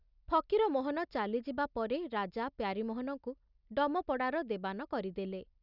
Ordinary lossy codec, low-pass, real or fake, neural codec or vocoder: none; 5.4 kHz; real; none